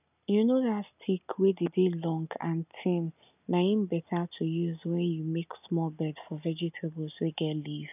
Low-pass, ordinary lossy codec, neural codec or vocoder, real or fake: 3.6 kHz; none; none; real